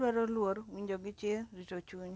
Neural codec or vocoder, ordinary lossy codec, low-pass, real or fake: none; none; none; real